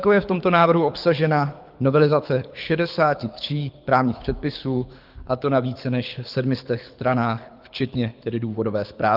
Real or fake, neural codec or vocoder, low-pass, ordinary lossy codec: fake; codec, 24 kHz, 6 kbps, HILCodec; 5.4 kHz; Opus, 24 kbps